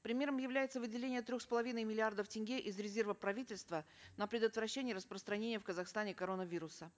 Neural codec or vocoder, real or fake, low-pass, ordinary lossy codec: none; real; none; none